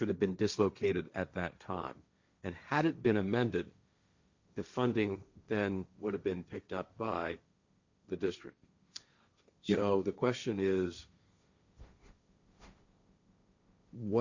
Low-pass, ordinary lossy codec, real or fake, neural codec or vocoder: 7.2 kHz; Opus, 64 kbps; fake; codec, 16 kHz, 1.1 kbps, Voila-Tokenizer